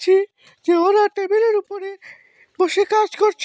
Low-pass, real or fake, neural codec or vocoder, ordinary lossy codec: none; real; none; none